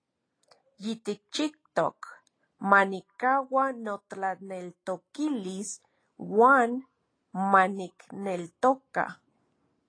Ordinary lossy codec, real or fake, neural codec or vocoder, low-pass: AAC, 32 kbps; real; none; 9.9 kHz